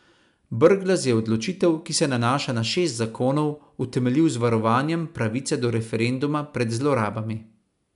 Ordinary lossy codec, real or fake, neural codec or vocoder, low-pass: none; real; none; 10.8 kHz